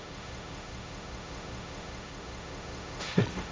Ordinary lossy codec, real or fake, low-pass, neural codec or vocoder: none; fake; none; codec, 16 kHz, 1.1 kbps, Voila-Tokenizer